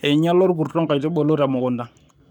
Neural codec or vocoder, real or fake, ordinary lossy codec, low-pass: codec, 44.1 kHz, 7.8 kbps, Pupu-Codec; fake; none; 19.8 kHz